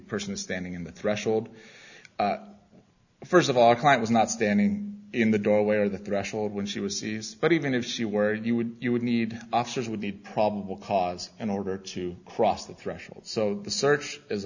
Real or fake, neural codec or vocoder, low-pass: real; none; 7.2 kHz